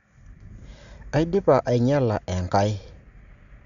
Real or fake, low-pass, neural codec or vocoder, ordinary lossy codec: real; 7.2 kHz; none; Opus, 64 kbps